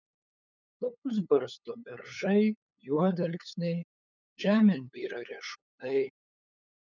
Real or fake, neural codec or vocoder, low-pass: fake; codec, 16 kHz, 8 kbps, FunCodec, trained on LibriTTS, 25 frames a second; 7.2 kHz